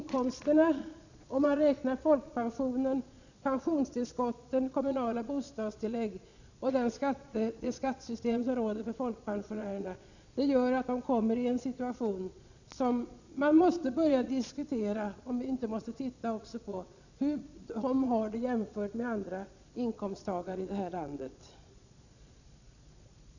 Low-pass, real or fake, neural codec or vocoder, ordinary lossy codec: 7.2 kHz; fake; vocoder, 22.05 kHz, 80 mel bands, Vocos; none